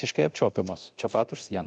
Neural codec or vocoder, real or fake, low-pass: codec, 24 kHz, 0.9 kbps, DualCodec; fake; 9.9 kHz